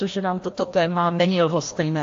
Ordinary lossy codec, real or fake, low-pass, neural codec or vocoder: AAC, 48 kbps; fake; 7.2 kHz; codec, 16 kHz, 1 kbps, FreqCodec, larger model